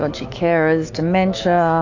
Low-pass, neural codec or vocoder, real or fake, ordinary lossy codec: 7.2 kHz; autoencoder, 48 kHz, 128 numbers a frame, DAC-VAE, trained on Japanese speech; fake; AAC, 48 kbps